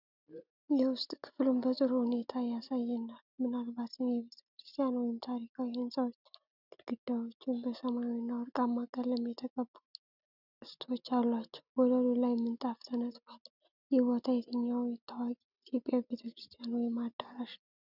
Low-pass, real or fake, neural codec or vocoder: 5.4 kHz; real; none